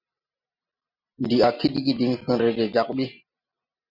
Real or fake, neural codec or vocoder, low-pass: real; none; 5.4 kHz